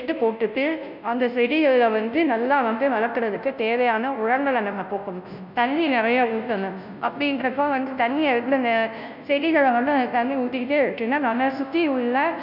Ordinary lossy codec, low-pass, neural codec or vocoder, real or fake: none; 5.4 kHz; codec, 16 kHz, 0.5 kbps, FunCodec, trained on Chinese and English, 25 frames a second; fake